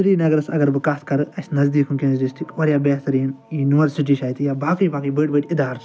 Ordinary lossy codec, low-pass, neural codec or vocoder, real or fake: none; none; none; real